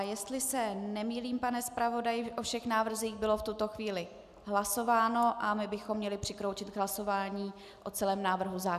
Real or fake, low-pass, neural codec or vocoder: real; 14.4 kHz; none